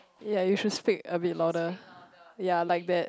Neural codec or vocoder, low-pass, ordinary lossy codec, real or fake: none; none; none; real